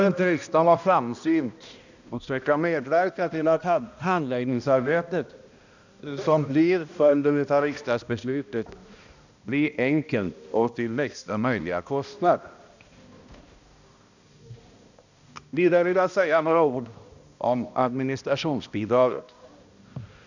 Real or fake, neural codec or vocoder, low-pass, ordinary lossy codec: fake; codec, 16 kHz, 1 kbps, X-Codec, HuBERT features, trained on balanced general audio; 7.2 kHz; none